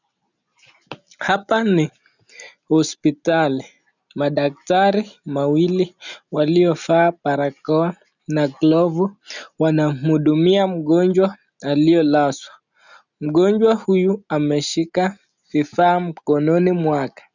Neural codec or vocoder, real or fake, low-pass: none; real; 7.2 kHz